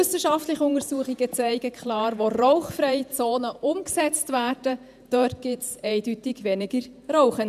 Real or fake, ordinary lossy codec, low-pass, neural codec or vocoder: fake; none; 14.4 kHz; vocoder, 48 kHz, 128 mel bands, Vocos